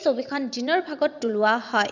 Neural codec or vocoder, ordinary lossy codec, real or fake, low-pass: vocoder, 22.05 kHz, 80 mel bands, WaveNeXt; none; fake; 7.2 kHz